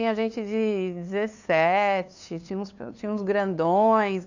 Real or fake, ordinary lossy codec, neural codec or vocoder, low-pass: fake; none; codec, 16 kHz, 2 kbps, FunCodec, trained on LibriTTS, 25 frames a second; 7.2 kHz